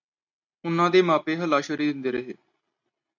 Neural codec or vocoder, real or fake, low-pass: none; real; 7.2 kHz